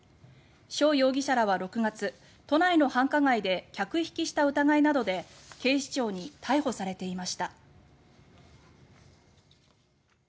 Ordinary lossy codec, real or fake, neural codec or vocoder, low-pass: none; real; none; none